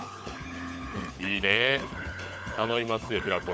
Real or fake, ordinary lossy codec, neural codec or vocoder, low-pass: fake; none; codec, 16 kHz, 16 kbps, FunCodec, trained on LibriTTS, 50 frames a second; none